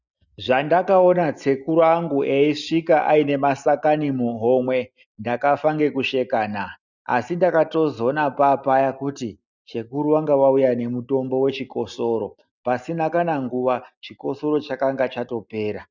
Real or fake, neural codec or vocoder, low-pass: real; none; 7.2 kHz